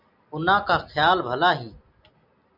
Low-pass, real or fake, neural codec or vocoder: 5.4 kHz; real; none